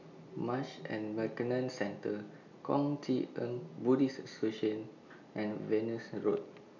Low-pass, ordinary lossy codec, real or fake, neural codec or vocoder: 7.2 kHz; none; real; none